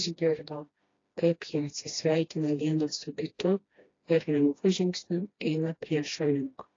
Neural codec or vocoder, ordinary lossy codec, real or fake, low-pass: codec, 16 kHz, 1 kbps, FreqCodec, smaller model; AAC, 32 kbps; fake; 7.2 kHz